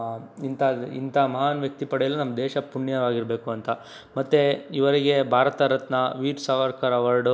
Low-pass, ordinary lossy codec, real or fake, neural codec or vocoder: none; none; real; none